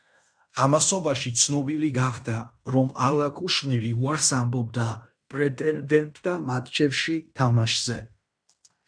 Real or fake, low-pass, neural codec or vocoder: fake; 9.9 kHz; codec, 16 kHz in and 24 kHz out, 0.9 kbps, LongCat-Audio-Codec, fine tuned four codebook decoder